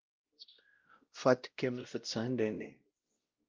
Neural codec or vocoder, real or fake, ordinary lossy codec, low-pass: codec, 16 kHz, 0.5 kbps, X-Codec, WavLM features, trained on Multilingual LibriSpeech; fake; Opus, 24 kbps; 7.2 kHz